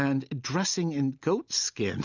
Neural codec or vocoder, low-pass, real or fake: none; 7.2 kHz; real